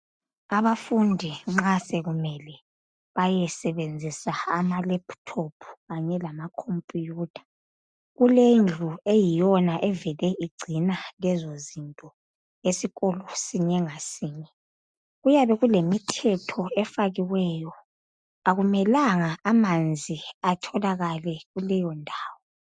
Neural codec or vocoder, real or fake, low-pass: none; real; 9.9 kHz